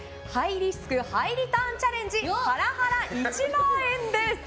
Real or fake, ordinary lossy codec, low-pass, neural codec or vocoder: real; none; none; none